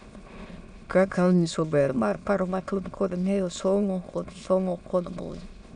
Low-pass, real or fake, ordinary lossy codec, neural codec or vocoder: 9.9 kHz; fake; none; autoencoder, 22.05 kHz, a latent of 192 numbers a frame, VITS, trained on many speakers